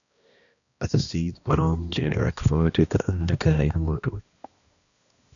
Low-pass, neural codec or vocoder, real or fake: 7.2 kHz; codec, 16 kHz, 1 kbps, X-Codec, HuBERT features, trained on balanced general audio; fake